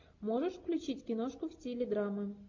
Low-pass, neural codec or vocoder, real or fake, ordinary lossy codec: 7.2 kHz; none; real; MP3, 48 kbps